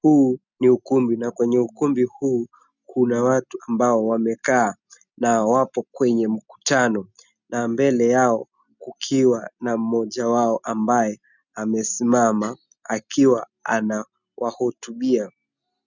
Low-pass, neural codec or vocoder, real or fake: 7.2 kHz; none; real